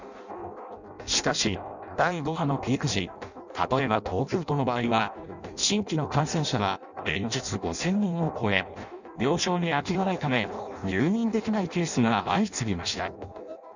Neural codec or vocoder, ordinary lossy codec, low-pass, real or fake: codec, 16 kHz in and 24 kHz out, 0.6 kbps, FireRedTTS-2 codec; none; 7.2 kHz; fake